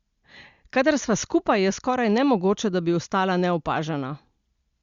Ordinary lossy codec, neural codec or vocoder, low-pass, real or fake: Opus, 64 kbps; none; 7.2 kHz; real